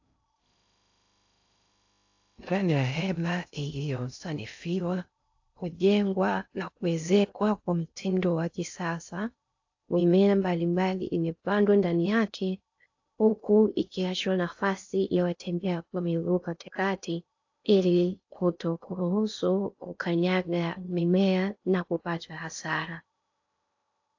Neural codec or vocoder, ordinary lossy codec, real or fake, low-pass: codec, 16 kHz in and 24 kHz out, 0.6 kbps, FocalCodec, streaming, 2048 codes; AAC, 48 kbps; fake; 7.2 kHz